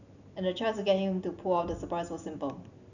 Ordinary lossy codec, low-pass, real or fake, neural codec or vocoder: none; 7.2 kHz; real; none